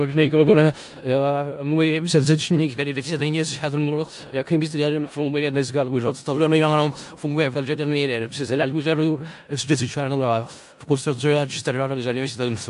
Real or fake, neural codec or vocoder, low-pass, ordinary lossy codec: fake; codec, 16 kHz in and 24 kHz out, 0.4 kbps, LongCat-Audio-Codec, four codebook decoder; 10.8 kHz; AAC, 64 kbps